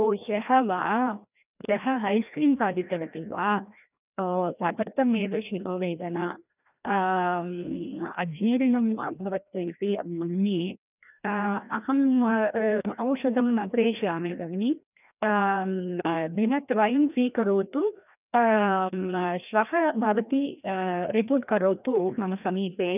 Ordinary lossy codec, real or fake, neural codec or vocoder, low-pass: none; fake; codec, 16 kHz, 1 kbps, FreqCodec, larger model; 3.6 kHz